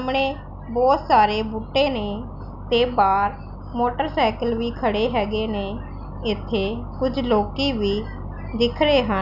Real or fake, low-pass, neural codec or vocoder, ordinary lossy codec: real; 5.4 kHz; none; none